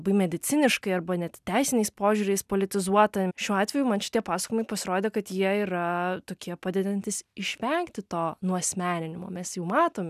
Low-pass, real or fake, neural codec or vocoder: 14.4 kHz; real; none